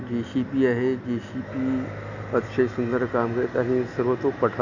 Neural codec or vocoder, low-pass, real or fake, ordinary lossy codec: none; 7.2 kHz; real; none